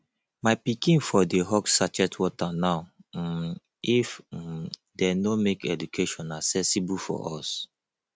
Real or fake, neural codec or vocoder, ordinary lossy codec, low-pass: real; none; none; none